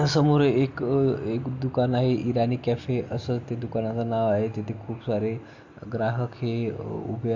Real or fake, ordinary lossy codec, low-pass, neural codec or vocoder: real; AAC, 48 kbps; 7.2 kHz; none